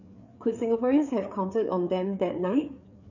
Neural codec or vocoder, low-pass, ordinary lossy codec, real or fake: codec, 16 kHz, 4 kbps, FreqCodec, larger model; 7.2 kHz; none; fake